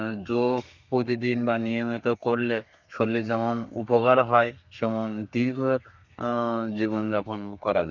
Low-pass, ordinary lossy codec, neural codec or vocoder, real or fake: 7.2 kHz; none; codec, 32 kHz, 1.9 kbps, SNAC; fake